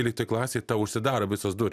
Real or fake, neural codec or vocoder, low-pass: real; none; 14.4 kHz